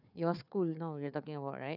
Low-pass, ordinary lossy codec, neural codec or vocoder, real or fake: 5.4 kHz; none; codec, 16 kHz, 4 kbps, FunCodec, trained on Chinese and English, 50 frames a second; fake